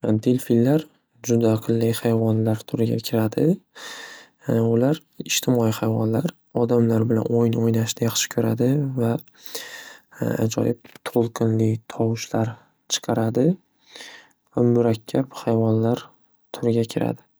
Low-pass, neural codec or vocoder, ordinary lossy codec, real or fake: none; none; none; real